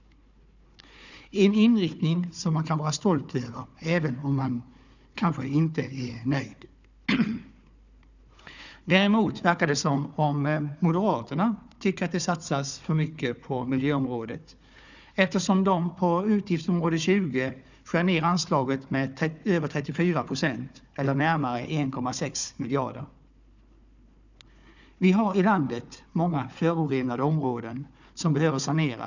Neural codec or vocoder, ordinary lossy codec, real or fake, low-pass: codec, 16 kHz, 4 kbps, FunCodec, trained on Chinese and English, 50 frames a second; none; fake; 7.2 kHz